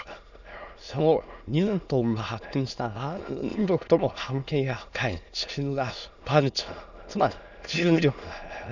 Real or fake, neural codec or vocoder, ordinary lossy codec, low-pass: fake; autoencoder, 22.05 kHz, a latent of 192 numbers a frame, VITS, trained on many speakers; none; 7.2 kHz